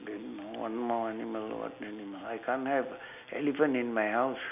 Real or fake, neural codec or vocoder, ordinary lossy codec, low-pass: real; none; none; 3.6 kHz